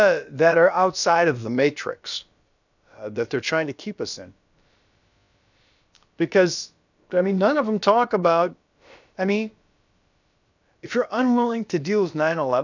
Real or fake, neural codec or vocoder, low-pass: fake; codec, 16 kHz, about 1 kbps, DyCAST, with the encoder's durations; 7.2 kHz